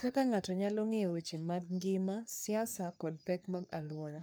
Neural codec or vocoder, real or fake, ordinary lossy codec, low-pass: codec, 44.1 kHz, 3.4 kbps, Pupu-Codec; fake; none; none